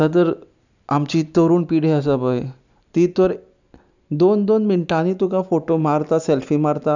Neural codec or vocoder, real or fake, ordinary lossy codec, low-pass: none; real; none; 7.2 kHz